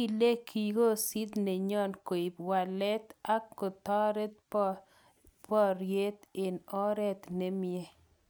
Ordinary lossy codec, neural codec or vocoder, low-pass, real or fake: none; none; none; real